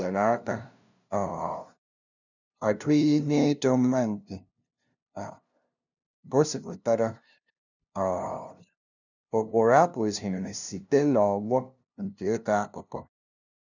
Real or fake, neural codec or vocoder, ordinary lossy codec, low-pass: fake; codec, 16 kHz, 0.5 kbps, FunCodec, trained on LibriTTS, 25 frames a second; none; 7.2 kHz